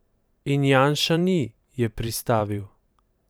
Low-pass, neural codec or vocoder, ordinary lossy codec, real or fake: none; vocoder, 44.1 kHz, 128 mel bands every 512 samples, BigVGAN v2; none; fake